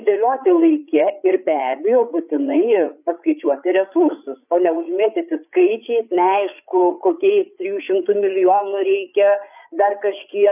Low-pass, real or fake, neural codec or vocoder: 3.6 kHz; fake; codec, 16 kHz, 8 kbps, FreqCodec, larger model